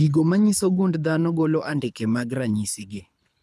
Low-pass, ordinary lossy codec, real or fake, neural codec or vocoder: none; none; fake; codec, 24 kHz, 6 kbps, HILCodec